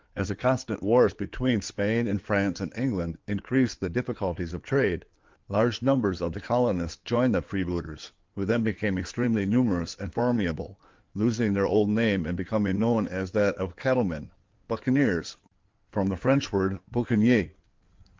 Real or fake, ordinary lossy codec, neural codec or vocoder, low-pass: fake; Opus, 32 kbps; codec, 16 kHz in and 24 kHz out, 2.2 kbps, FireRedTTS-2 codec; 7.2 kHz